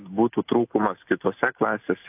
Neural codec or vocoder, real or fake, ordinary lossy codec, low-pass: none; real; AAC, 32 kbps; 3.6 kHz